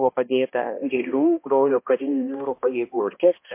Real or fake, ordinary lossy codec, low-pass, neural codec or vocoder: fake; MP3, 24 kbps; 3.6 kHz; codec, 16 kHz, 1 kbps, X-Codec, HuBERT features, trained on balanced general audio